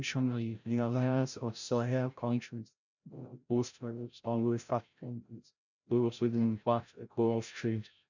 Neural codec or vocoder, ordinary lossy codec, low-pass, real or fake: codec, 16 kHz, 0.5 kbps, FreqCodec, larger model; none; 7.2 kHz; fake